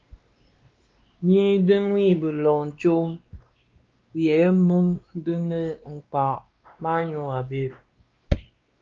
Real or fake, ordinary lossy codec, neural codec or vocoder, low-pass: fake; Opus, 24 kbps; codec, 16 kHz, 2 kbps, X-Codec, WavLM features, trained on Multilingual LibriSpeech; 7.2 kHz